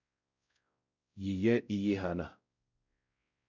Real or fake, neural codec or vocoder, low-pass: fake; codec, 16 kHz, 0.5 kbps, X-Codec, WavLM features, trained on Multilingual LibriSpeech; 7.2 kHz